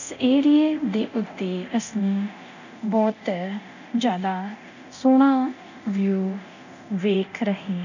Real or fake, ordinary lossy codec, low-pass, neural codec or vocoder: fake; none; 7.2 kHz; codec, 24 kHz, 0.9 kbps, DualCodec